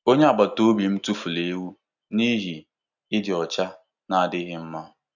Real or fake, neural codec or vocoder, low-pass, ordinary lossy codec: real; none; 7.2 kHz; none